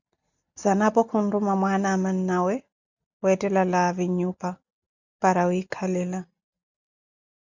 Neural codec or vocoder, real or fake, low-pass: none; real; 7.2 kHz